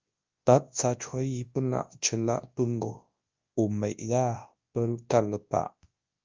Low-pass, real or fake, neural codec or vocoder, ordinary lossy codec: 7.2 kHz; fake; codec, 24 kHz, 0.9 kbps, WavTokenizer, large speech release; Opus, 32 kbps